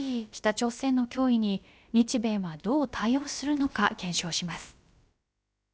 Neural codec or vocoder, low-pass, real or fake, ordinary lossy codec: codec, 16 kHz, about 1 kbps, DyCAST, with the encoder's durations; none; fake; none